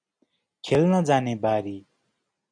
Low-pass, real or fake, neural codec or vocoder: 9.9 kHz; real; none